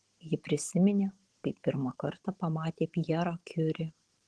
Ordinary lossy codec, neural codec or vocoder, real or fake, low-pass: Opus, 16 kbps; vocoder, 44.1 kHz, 128 mel bands every 512 samples, BigVGAN v2; fake; 10.8 kHz